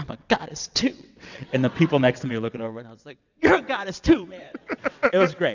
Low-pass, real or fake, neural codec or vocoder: 7.2 kHz; fake; vocoder, 22.05 kHz, 80 mel bands, WaveNeXt